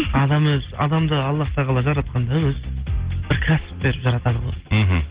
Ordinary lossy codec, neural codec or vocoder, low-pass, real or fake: Opus, 16 kbps; none; 3.6 kHz; real